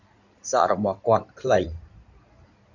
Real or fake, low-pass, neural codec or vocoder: fake; 7.2 kHz; codec, 16 kHz in and 24 kHz out, 2.2 kbps, FireRedTTS-2 codec